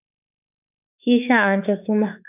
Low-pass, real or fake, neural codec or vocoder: 3.6 kHz; fake; autoencoder, 48 kHz, 32 numbers a frame, DAC-VAE, trained on Japanese speech